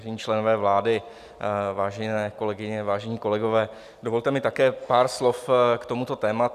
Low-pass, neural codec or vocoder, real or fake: 14.4 kHz; none; real